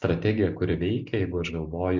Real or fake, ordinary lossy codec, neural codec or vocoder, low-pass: real; MP3, 64 kbps; none; 7.2 kHz